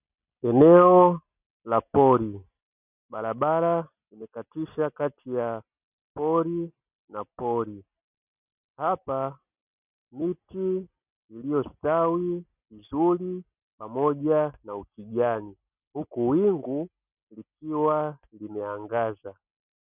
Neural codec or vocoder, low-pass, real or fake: none; 3.6 kHz; real